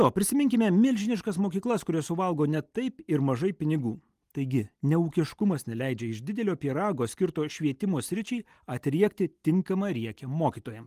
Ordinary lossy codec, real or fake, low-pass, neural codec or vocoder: Opus, 24 kbps; real; 14.4 kHz; none